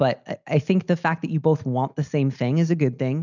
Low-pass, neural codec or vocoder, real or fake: 7.2 kHz; none; real